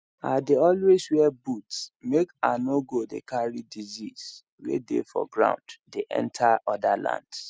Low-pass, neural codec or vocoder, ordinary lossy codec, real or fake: none; none; none; real